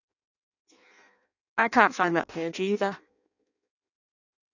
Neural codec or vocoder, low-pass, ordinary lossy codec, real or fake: codec, 16 kHz in and 24 kHz out, 0.6 kbps, FireRedTTS-2 codec; 7.2 kHz; none; fake